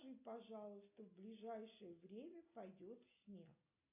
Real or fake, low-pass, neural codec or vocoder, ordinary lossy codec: real; 3.6 kHz; none; AAC, 32 kbps